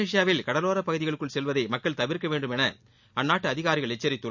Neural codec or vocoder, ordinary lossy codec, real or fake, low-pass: none; none; real; 7.2 kHz